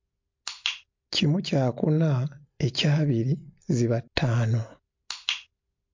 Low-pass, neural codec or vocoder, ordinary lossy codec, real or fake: 7.2 kHz; none; MP3, 48 kbps; real